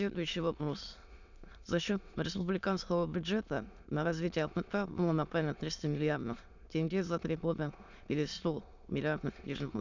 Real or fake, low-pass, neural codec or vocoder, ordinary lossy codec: fake; 7.2 kHz; autoencoder, 22.05 kHz, a latent of 192 numbers a frame, VITS, trained on many speakers; none